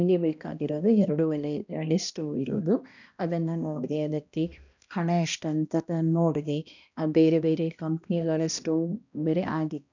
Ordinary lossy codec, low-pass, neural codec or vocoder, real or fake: none; 7.2 kHz; codec, 16 kHz, 1 kbps, X-Codec, HuBERT features, trained on balanced general audio; fake